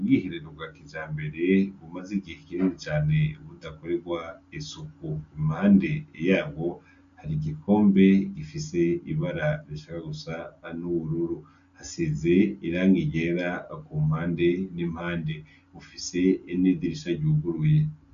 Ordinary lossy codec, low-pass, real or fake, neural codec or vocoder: AAC, 64 kbps; 7.2 kHz; real; none